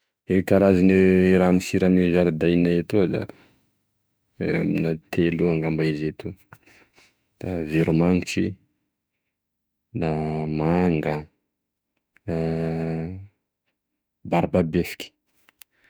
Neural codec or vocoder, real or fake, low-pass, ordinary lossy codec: autoencoder, 48 kHz, 32 numbers a frame, DAC-VAE, trained on Japanese speech; fake; none; none